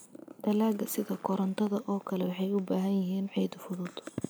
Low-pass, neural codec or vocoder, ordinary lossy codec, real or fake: none; none; none; real